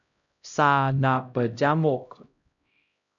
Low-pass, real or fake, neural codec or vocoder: 7.2 kHz; fake; codec, 16 kHz, 0.5 kbps, X-Codec, HuBERT features, trained on LibriSpeech